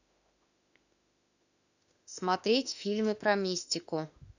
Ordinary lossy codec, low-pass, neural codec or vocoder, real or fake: none; 7.2 kHz; autoencoder, 48 kHz, 32 numbers a frame, DAC-VAE, trained on Japanese speech; fake